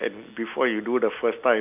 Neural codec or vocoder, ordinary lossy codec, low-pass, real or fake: none; none; 3.6 kHz; real